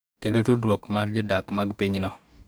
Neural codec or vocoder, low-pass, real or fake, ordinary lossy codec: codec, 44.1 kHz, 2.6 kbps, DAC; none; fake; none